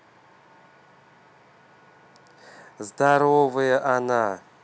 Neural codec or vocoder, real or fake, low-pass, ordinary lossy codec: none; real; none; none